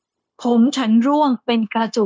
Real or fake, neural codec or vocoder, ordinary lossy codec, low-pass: fake; codec, 16 kHz, 0.9 kbps, LongCat-Audio-Codec; none; none